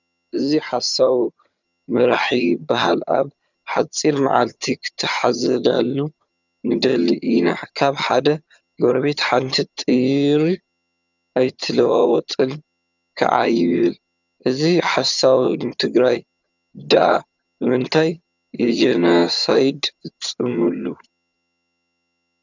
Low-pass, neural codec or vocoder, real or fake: 7.2 kHz; vocoder, 22.05 kHz, 80 mel bands, HiFi-GAN; fake